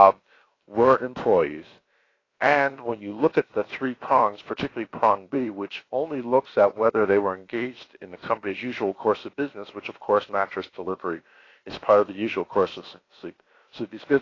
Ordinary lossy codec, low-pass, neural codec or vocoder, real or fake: AAC, 32 kbps; 7.2 kHz; codec, 16 kHz, about 1 kbps, DyCAST, with the encoder's durations; fake